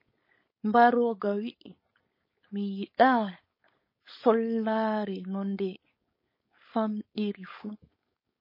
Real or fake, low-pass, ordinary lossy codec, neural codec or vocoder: fake; 5.4 kHz; MP3, 24 kbps; codec, 16 kHz, 4.8 kbps, FACodec